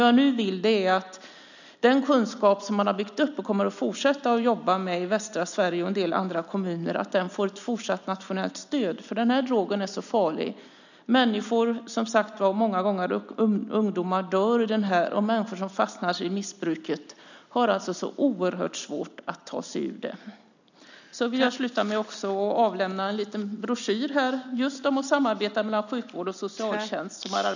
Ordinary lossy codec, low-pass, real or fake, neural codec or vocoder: none; 7.2 kHz; real; none